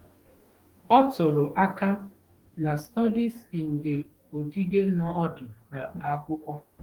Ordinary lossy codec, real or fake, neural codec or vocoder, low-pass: Opus, 24 kbps; fake; codec, 44.1 kHz, 2.6 kbps, DAC; 19.8 kHz